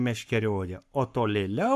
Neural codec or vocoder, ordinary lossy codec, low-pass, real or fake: codec, 44.1 kHz, 7.8 kbps, Pupu-Codec; MP3, 96 kbps; 14.4 kHz; fake